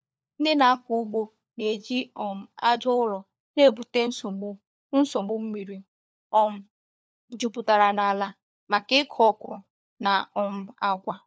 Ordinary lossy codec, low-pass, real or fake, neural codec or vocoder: none; none; fake; codec, 16 kHz, 4 kbps, FunCodec, trained on LibriTTS, 50 frames a second